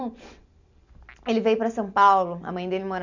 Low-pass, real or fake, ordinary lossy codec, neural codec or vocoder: 7.2 kHz; real; none; none